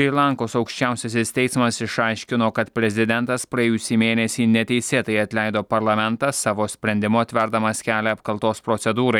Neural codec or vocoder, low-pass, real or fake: none; 19.8 kHz; real